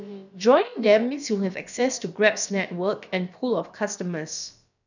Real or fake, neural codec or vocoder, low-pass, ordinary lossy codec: fake; codec, 16 kHz, about 1 kbps, DyCAST, with the encoder's durations; 7.2 kHz; none